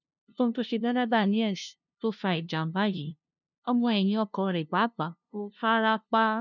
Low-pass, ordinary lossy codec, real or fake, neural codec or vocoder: 7.2 kHz; none; fake; codec, 16 kHz, 0.5 kbps, FunCodec, trained on LibriTTS, 25 frames a second